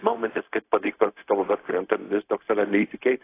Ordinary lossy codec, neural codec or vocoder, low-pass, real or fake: AAC, 24 kbps; codec, 16 kHz, 0.4 kbps, LongCat-Audio-Codec; 3.6 kHz; fake